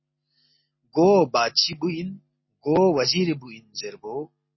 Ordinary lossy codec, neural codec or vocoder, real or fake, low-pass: MP3, 24 kbps; none; real; 7.2 kHz